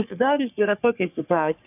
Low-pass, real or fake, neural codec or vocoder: 3.6 kHz; fake; codec, 44.1 kHz, 3.4 kbps, Pupu-Codec